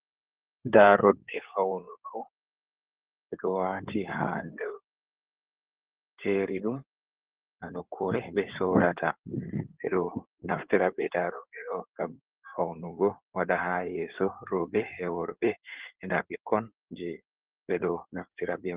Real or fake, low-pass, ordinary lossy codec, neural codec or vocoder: fake; 3.6 kHz; Opus, 16 kbps; codec, 16 kHz in and 24 kHz out, 2.2 kbps, FireRedTTS-2 codec